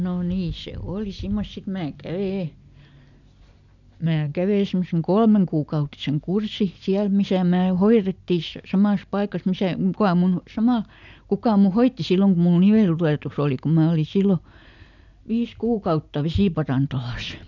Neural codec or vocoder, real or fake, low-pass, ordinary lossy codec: none; real; 7.2 kHz; none